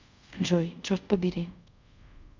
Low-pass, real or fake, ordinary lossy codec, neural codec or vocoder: 7.2 kHz; fake; MP3, 64 kbps; codec, 24 kHz, 0.5 kbps, DualCodec